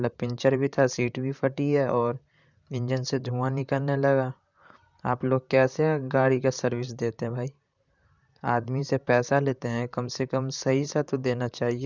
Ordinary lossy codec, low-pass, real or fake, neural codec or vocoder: none; 7.2 kHz; fake; vocoder, 44.1 kHz, 128 mel bands, Pupu-Vocoder